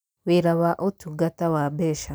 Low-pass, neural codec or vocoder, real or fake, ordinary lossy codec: none; none; real; none